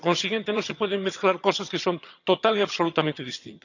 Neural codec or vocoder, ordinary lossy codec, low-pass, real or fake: vocoder, 22.05 kHz, 80 mel bands, HiFi-GAN; none; 7.2 kHz; fake